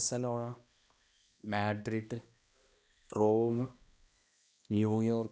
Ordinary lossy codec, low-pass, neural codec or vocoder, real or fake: none; none; codec, 16 kHz, 1 kbps, X-Codec, HuBERT features, trained on balanced general audio; fake